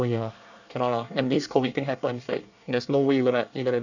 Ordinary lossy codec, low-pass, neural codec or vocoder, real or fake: none; 7.2 kHz; codec, 24 kHz, 1 kbps, SNAC; fake